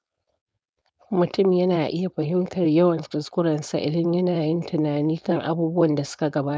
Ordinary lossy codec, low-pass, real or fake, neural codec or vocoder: none; none; fake; codec, 16 kHz, 4.8 kbps, FACodec